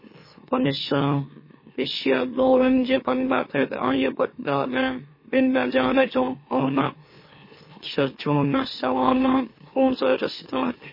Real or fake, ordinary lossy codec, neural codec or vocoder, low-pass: fake; MP3, 24 kbps; autoencoder, 44.1 kHz, a latent of 192 numbers a frame, MeloTTS; 5.4 kHz